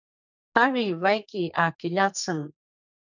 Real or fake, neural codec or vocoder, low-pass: fake; codec, 44.1 kHz, 2.6 kbps, SNAC; 7.2 kHz